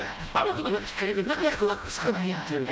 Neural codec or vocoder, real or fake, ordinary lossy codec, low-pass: codec, 16 kHz, 0.5 kbps, FreqCodec, smaller model; fake; none; none